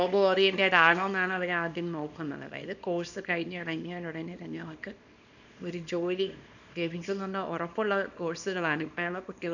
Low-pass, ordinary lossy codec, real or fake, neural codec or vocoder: 7.2 kHz; none; fake; codec, 24 kHz, 0.9 kbps, WavTokenizer, small release